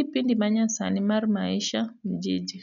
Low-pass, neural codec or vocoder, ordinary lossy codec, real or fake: 7.2 kHz; none; none; real